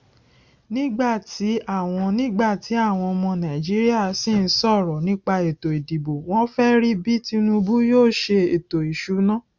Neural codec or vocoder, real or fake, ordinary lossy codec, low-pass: none; real; Opus, 64 kbps; 7.2 kHz